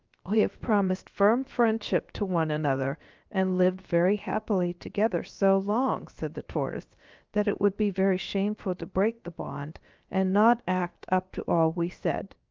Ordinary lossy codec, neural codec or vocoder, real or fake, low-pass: Opus, 24 kbps; codec, 16 kHz, about 1 kbps, DyCAST, with the encoder's durations; fake; 7.2 kHz